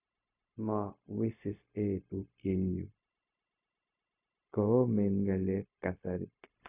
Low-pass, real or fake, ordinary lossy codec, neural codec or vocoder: 3.6 kHz; fake; none; codec, 16 kHz, 0.4 kbps, LongCat-Audio-Codec